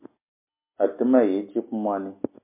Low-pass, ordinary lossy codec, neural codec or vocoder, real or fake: 3.6 kHz; MP3, 24 kbps; none; real